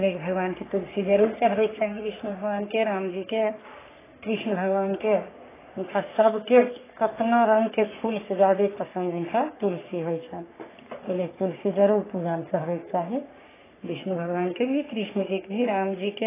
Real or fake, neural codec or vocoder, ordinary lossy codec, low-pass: fake; codec, 44.1 kHz, 3.4 kbps, Pupu-Codec; AAC, 16 kbps; 3.6 kHz